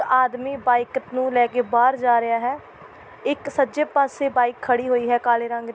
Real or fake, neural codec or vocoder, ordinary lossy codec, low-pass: real; none; none; none